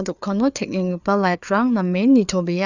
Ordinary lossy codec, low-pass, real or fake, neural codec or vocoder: none; 7.2 kHz; fake; codec, 16 kHz, 4 kbps, FreqCodec, larger model